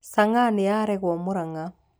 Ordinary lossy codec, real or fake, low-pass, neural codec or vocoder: none; real; none; none